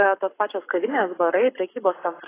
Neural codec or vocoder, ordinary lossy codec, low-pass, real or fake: codec, 44.1 kHz, 7.8 kbps, Pupu-Codec; AAC, 16 kbps; 3.6 kHz; fake